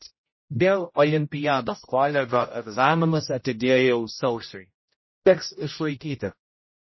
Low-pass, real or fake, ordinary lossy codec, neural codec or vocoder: 7.2 kHz; fake; MP3, 24 kbps; codec, 16 kHz, 0.5 kbps, X-Codec, HuBERT features, trained on general audio